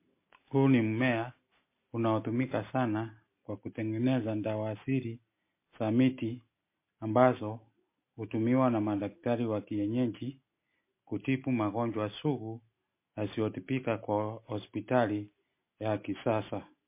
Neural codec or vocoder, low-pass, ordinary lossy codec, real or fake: none; 3.6 kHz; MP3, 24 kbps; real